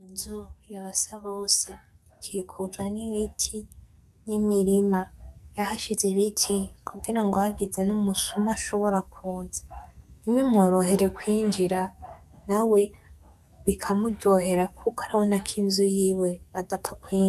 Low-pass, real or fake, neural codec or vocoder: 14.4 kHz; fake; codec, 44.1 kHz, 2.6 kbps, SNAC